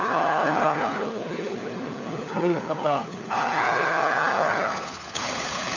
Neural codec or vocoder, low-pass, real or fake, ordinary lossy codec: codec, 16 kHz, 4 kbps, FunCodec, trained on LibriTTS, 50 frames a second; 7.2 kHz; fake; none